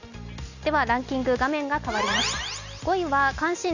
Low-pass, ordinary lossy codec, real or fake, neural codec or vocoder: 7.2 kHz; none; real; none